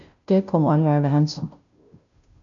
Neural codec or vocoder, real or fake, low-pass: codec, 16 kHz, 0.5 kbps, FunCodec, trained on Chinese and English, 25 frames a second; fake; 7.2 kHz